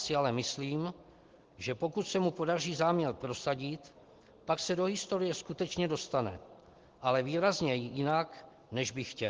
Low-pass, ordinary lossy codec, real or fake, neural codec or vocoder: 7.2 kHz; Opus, 16 kbps; real; none